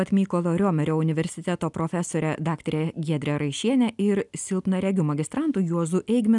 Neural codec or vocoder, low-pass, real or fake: none; 10.8 kHz; real